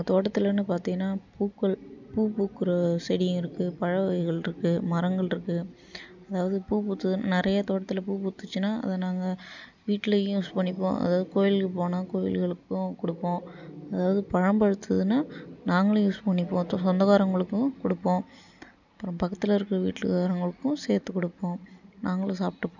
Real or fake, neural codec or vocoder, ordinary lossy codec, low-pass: real; none; none; 7.2 kHz